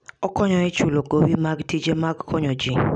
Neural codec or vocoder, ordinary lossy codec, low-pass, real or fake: none; none; 9.9 kHz; real